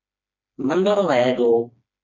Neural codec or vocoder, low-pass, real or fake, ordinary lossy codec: codec, 16 kHz, 2 kbps, FreqCodec, smaller model; 7.2 kHz; fake; MP3, 48 kbps